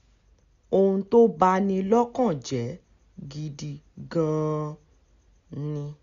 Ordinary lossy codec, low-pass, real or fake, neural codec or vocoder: none; 7.2 kHz; real; none